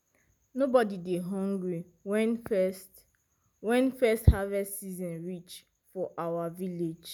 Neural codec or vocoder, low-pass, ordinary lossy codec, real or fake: none; 19.8 kHz; none; real